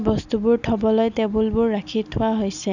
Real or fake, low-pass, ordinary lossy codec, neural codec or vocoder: real; 7.2 kHz; none; none